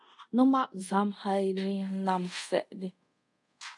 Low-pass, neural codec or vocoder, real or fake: 10.8 kHz; codec, 24 kHz, 0.5 kbps, DualCodec; fake